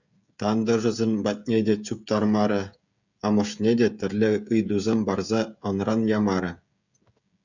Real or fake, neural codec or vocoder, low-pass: fake; codec, 16 kHz, 16 kbps, FreqCodec, smaller model; 7.2 kHz